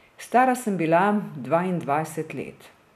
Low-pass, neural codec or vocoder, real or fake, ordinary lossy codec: 14.4 kHz; none; real; none